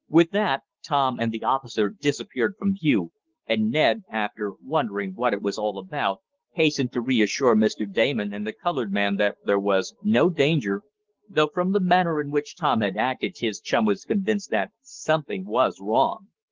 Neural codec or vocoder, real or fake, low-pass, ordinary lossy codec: codec, 44.1 kHz, 7.8 kbps, Pupu-Codec; fake; 7.2 kHz; Opus, 16 kbps